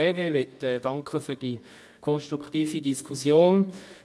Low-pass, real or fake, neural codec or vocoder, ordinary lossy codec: none; fake; codec, 24 kHz, 0.9 kbps, WavTokenizer, medium music audio release; none